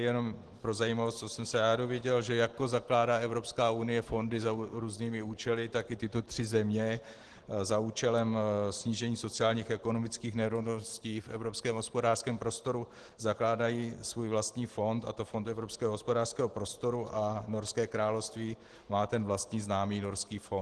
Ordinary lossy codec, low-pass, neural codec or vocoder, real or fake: Opus, 16 kbps; 10.8 kHz; none; real